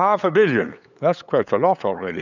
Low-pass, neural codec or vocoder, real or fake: 7.2 kHz; codec, 16 kHz, 8 kbps, FunCodec, trained on LibriTTS, 25 frames a second; fake